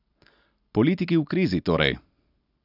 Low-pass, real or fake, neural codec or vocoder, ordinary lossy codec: 5.4 kHz; real; none; none